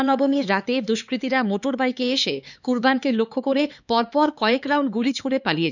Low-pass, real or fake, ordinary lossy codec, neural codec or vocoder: 7.2 kHz; fake; none; codec, 16 kHz, 4 kbps, X-Codec, HuBERT features, trained on LibriSpeech